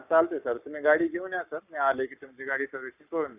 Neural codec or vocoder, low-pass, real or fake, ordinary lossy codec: codec, 44.1 kHz, 7.8 kbps, Pupu-Codec; 3.6 kHz; fake; none